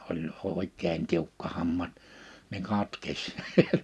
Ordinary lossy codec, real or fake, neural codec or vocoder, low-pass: none; real; none; none